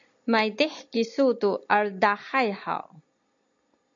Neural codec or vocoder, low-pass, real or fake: none; 7.2 kHz; real